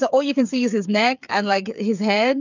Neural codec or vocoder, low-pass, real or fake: codec, 16 kHz, 8 kbps, FreqCodec, smaller model; 7.2 kHz; fake